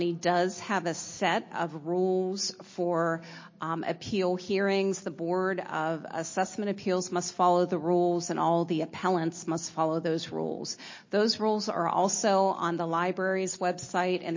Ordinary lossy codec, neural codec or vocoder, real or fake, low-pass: MP3, 32 kbps; none; real; 7.2 kHz